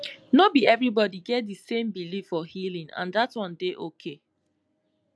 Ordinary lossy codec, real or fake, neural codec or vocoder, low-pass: none; real; none; none